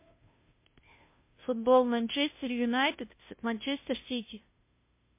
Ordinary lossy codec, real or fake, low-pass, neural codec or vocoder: MP3, 24 kbps; fake; 3.6 kHz; codec, 16 kHz, 0.5 kbps, FunCodec, trained on Chinese and English, 25 frames a second